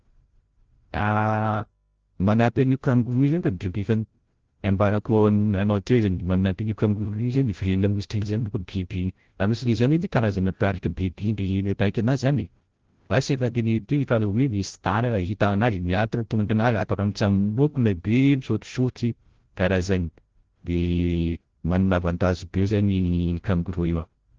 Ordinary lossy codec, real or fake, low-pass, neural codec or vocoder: Opus, 16 kbps; fake; 7.2 kHz; codec, 16 kHz, 0.5 kbps, FreqCodec, larger model